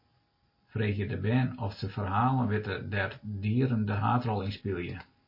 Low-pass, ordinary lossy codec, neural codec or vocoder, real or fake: 5.4 kHz; MP3, 24 kbps; none; real